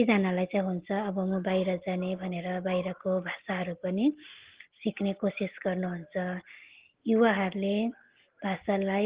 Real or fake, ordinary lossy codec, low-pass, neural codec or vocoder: real; Opus, 16 kbps; 3.6 kHz; none